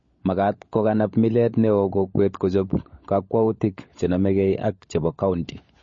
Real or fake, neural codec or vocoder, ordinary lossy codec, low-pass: fake; codec, 16 kHz, 16 kbps, FunCodec, trained on LibriTTS, 50 frames a second; MP3, 32 kbps; 7.2 kHz